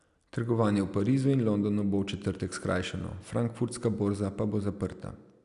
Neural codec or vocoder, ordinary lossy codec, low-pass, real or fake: none; none; 10.8 kHz; real